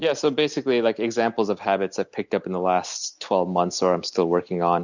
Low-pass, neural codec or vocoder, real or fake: 7.2 kHz; none; real